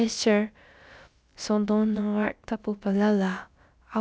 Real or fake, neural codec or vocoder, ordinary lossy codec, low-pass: fake; codec, 16 kHz, about 1 kbps, DyCAST, with the encoder's durations; none; none